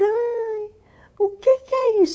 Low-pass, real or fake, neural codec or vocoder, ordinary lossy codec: none; fake; codec, 16 kHz, 8 kbps, FunCodec, trained on LibriTTS, 25 frames a second; none